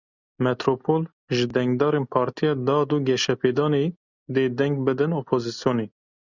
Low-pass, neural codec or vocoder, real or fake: 7.2 kHz; none; real